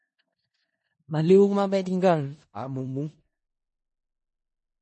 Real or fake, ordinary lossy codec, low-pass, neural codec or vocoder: fake; MP3, 32 kbps; 10.8 kHz; codec, 16 kHz in and 24 kHz out, 0.4 kbps, LongCat-Audio-Codec, four codebook decoder